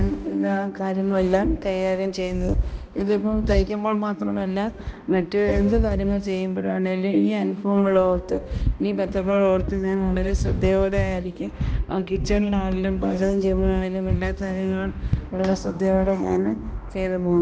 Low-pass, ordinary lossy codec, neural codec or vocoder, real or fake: none; none; codec, 16 kHz, 1 kbps, X-Codec, HuBERT features, trained on balanced general audio; fake